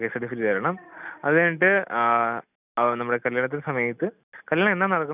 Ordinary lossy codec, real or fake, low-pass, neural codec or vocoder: none; real; 3.6 kHz; none